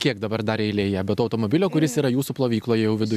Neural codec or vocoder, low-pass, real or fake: none; 14.4 kHz; real